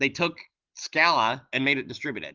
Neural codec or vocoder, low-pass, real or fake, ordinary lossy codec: none; 7.2 kHz; real; Opus, 32 kbps